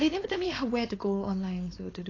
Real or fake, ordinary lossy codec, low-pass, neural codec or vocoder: fake; AAC, 32 kbps; 7.2 kHz; codec, 16 kHz, 4.8 kbps, FACodec